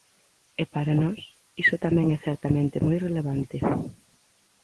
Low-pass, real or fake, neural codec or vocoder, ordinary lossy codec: 10.8 kHz; real; none; Opus, 16 kbps